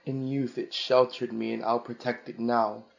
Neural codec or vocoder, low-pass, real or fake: none; 7.2 kHz; real